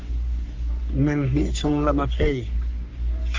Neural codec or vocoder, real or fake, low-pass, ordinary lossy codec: codec, 44.1 kHz, 3.4 kbps, Pupu-Codec; fake; 7.2 kHz; Opus, 32 kbps